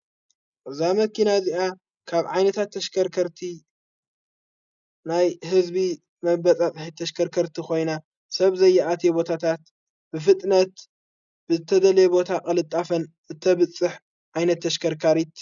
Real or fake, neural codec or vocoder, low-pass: real; none; 7.2 kHz